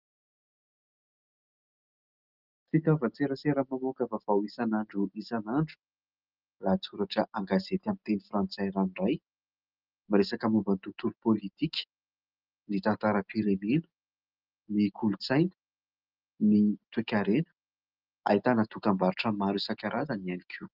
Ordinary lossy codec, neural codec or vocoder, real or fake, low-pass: Opus, 24 kbps; none; real; 5.4 kHz